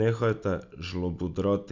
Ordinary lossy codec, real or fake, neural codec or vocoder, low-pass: MP3, 64 kbps; real; none; 7.2 kHz